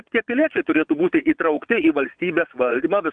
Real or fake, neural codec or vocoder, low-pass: fake; vocoder, 22.05 kHz, 80 mel bands, Vocos; 9.9 kHz